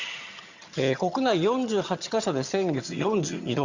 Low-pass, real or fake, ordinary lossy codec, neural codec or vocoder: 7.2 kHz; fake; Opus, 64 kbps; vocoder, 22.05 kHz, 80 mel bands, HiFi-GAN